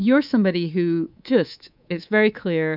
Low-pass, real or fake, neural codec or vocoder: 5.4 kHz; fake; codec, 24 kHz, 3.1 kbps, DualCodec